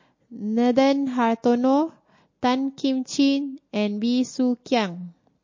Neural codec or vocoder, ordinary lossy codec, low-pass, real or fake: none; MP3, 32 kbps; 7.2 kHz; real